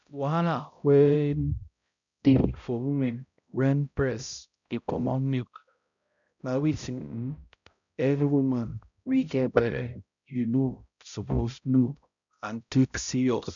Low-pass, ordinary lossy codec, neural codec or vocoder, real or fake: 7.2 kHz; none; codec, 16 kHz, 0.5 kbps, X-Codec, HuBERT features, trained on balanced general audio; fake